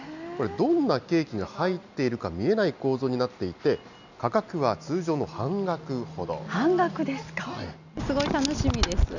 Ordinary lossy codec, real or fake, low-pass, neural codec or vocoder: none; real; 7.2 kHz; none